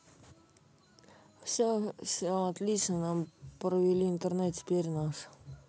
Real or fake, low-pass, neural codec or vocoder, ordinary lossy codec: real; none; none; none